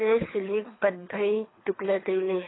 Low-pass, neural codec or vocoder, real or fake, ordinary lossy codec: 7.2 kHz; codec, 24 kHz, 3 kbps, HILCodec; fake; AAC, 16 kbps